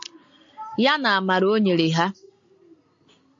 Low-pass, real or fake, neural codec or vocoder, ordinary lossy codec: 7.2 kHz; real; none; AAC, 64 kbps